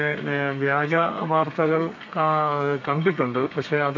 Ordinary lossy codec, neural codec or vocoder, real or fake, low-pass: AAC, 48 kbps; codec, 32 kHz, 1.9 kbps, SNAC; fake; 7.2 kHz